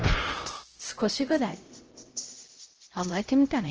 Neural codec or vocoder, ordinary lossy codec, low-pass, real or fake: codec, 16 kHz, 0.5 kbps, X-Codec, HuBERT features, trained on LibriSpeech; Opus, 16 kbps; 7.2 kHz; fake